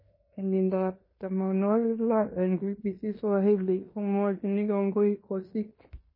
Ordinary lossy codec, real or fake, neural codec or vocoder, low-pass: MP3, 24 kbps; fake; codec, 16 kHz in and 24 kHz out, 0.9 kbps, LongCat-Audio-Codec, fine tuned four codebook decoder; 5.4 kHz